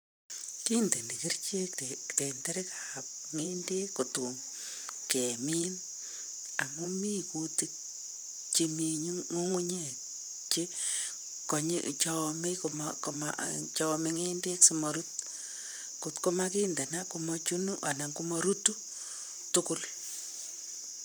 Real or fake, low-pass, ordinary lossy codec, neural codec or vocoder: fake; none; none; vocoder, 44.1 kHz, 128 mel bands, Pupu-Vocoder